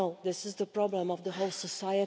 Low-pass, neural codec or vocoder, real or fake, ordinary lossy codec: none; none; real; none